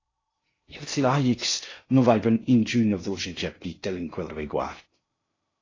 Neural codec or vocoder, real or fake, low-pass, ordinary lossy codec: codec, 16 kHz in and 24 kHz out, 0.6 kbps, FocalCodec, streaming, 2048 codes; fake; 7.2 kHz; AAC, 32 kbps